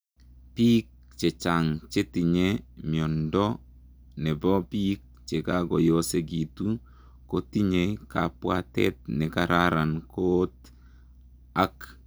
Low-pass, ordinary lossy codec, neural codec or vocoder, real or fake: none; none; none; real